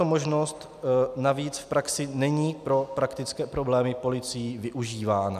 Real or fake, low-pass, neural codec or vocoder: real; 14.4 kHz; none